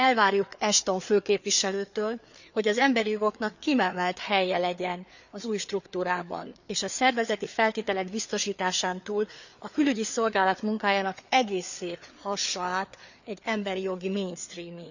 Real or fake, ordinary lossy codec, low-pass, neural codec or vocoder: fake; none; 7.2 kHz; codec, 16 kHz, 4 kbps, FreqCodec, larger model